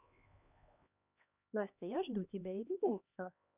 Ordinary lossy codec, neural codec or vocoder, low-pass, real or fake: none; codec, 16 kHz, 2 kbps, X-Codec, HuBERT features, trained on LibriSpeech; 3.6 kHz; fake